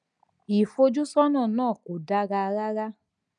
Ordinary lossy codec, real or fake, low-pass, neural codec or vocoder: none; real; 9.9 kHz; none